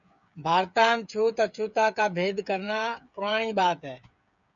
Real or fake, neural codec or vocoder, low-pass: fake; codec, 16 kHz, 8 kbps, FreqCodec, smaller model; 7.2 kHz